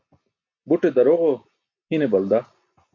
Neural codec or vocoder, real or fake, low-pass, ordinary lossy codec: none; real; 7.2 kHz; MP3, 48 kbps